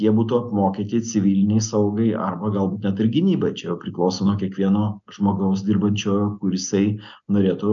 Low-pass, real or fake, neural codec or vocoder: 7.2 kHz; real; none